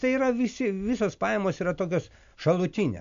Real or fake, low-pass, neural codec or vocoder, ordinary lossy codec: real; 7.2 kHz; none; AAC, 64 kbps